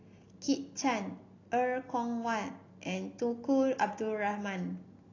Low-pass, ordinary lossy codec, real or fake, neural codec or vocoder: 7.2 kHz; AAC, 32 kbps; real; none